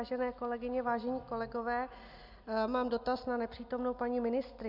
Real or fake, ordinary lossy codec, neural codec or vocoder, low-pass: real; MP3, 48 kbps; none; 5.4 kHz